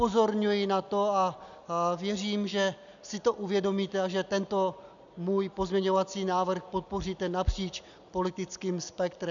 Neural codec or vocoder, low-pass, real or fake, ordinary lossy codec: none; 7.2 kHz; real; MP3, 96 kbps